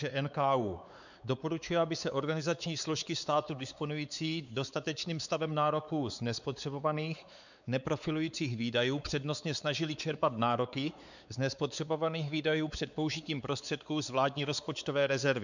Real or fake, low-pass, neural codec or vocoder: fake; 7.2 kHz; codec, 16 kHz, 4 kbps, X-Codec, WavLM features, trained on Multilingual LibriSpeech